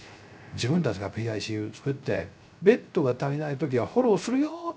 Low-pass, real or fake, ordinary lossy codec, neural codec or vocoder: none; fake; none; codec, 16 kHz, 0.3 kbps, FocalCodec